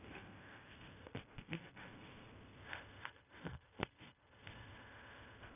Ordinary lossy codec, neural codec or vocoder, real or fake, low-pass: none; codec, 16 kHz in and 24 kHz out, 0.4 kbps, LongCat-Audio-Codec, fine tuned four codebook decoder; fake; 3.6 kHz